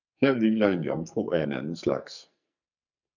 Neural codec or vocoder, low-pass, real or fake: codec, 44.1 kHz, 2.6 kbps, SNAC; 7.2 kHz; fake